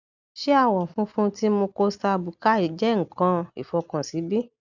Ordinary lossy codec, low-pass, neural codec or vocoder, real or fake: none; 7.2 kHz; none; real